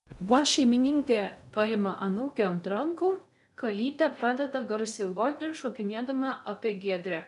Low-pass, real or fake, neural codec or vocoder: 10.8 kHz; fake; codec, 16 kHz in and 24 kHz out, 0.6 kbps, FocalCodec, streaming, 2048 codes